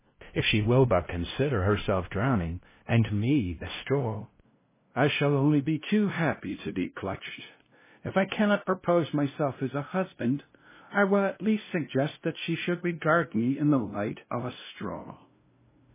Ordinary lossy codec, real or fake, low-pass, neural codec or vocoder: MP3, 16 kbps; fake; 3.6 kHz; codec, 16 kHz, 0.5 kbps, FunCodec, trained on LibriTTS, 25 frames a second